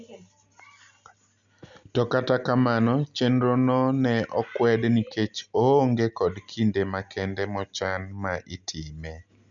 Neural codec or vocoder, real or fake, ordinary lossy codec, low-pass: none; real; none; 7.2 kHz